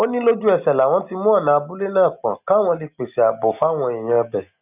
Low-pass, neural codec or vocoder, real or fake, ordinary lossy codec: 3.6 kHz; none; real; none